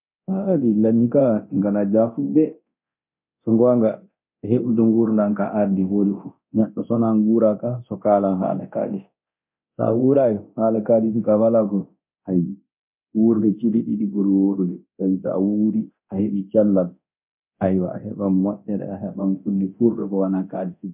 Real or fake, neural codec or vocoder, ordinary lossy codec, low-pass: fake; codec, 24 kHz, 0.9 kbps, DualCodec; MP3, 32 kbps; 3.6 kHz